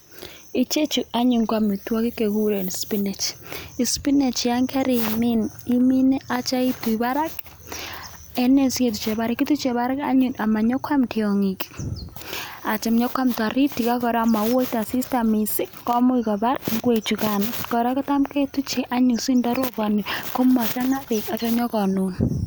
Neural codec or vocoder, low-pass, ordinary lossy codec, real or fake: none; none; none; real